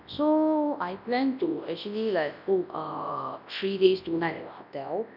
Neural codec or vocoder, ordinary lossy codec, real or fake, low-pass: codec, 24 kHz, 0.9 kbps, WavTokenizer, large speech release; none; fake; 5.4 kHz